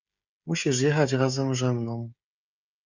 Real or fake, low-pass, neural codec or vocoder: fake; 7.2 kHz; codec, 16 kHz, 16 kbps, FreqCodec, smaller model